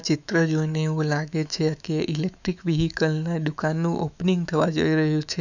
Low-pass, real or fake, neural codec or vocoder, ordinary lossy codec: 7.2 kHz; fake; autoencoder, 48 kHz, 128 numbers a frame, DAC-VAE, trained on Japanese speech; none